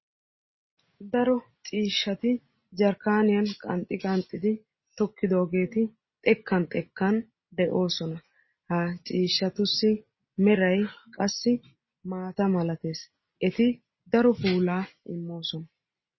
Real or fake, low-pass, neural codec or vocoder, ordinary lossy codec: real; 7.2 kHz; none; MP3, 24 kbps